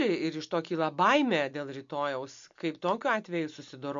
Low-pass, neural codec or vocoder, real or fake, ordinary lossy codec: 7.2 kHz; none; real; MP3, 48 kbps